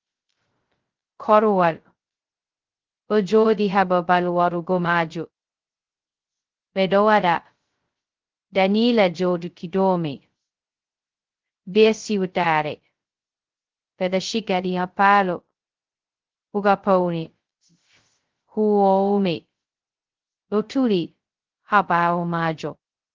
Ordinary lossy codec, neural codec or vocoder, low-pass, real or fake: Opus, 16 kbps; codec, 16 kHz, 0.2 kbps, FocalCodec; 7.2 kHz; fake